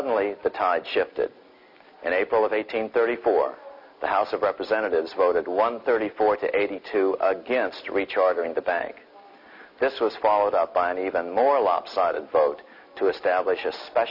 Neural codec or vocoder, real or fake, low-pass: none; real; 5.4 kHz